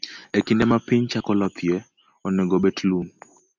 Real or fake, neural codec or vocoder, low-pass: real; none; 7.2 kHz